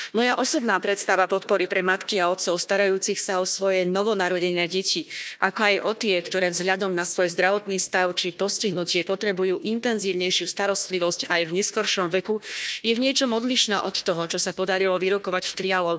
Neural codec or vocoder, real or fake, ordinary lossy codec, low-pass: codec, 16 kHz, 1 kbps, FunCodec, trained on Chinese and English, 50 frames a second; fake; none; none